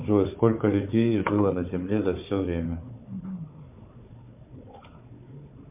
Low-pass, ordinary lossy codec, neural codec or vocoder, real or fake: 3.6 kHz; MP3, 32 kbps; codec, 16 kHz, 4 kbps, FunCodec, trained on Chinese and English, 50 frames a second; fake